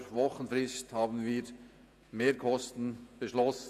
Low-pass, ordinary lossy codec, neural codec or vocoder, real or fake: 14.4 kHz; none; vocoder, 48 kHz, 128 mel bands, Vocos; fake